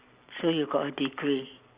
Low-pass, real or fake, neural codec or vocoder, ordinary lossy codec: 3.6 kHz; real; none; Opus, 64 kbps